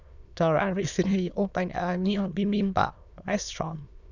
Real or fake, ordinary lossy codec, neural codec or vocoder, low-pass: fake; none; autoencoder, 22.05 kHz, a latent of 192 numbers a frame, VITS, trained on many speakers; 7.2 kHz